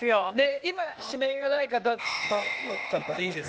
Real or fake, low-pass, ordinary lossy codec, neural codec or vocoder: fake; none; none; codec, 16 kHz, 0.8 kbps, ZipCodec